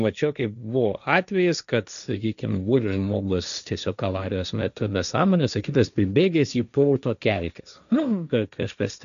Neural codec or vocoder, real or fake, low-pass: codec, 16 kHz, 1.1 kbps, Voila-Tokenizer; fake; 7.2 kHz